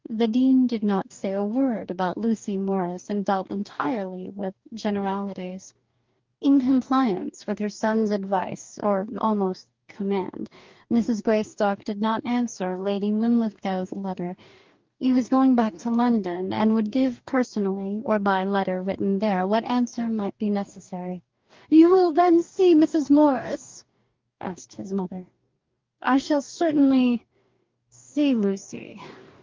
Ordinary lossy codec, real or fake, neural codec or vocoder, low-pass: Opus, 24 kbps; fake; codec, 44.1 kHz, 2.6 kbps, DAC; 7.2 kHz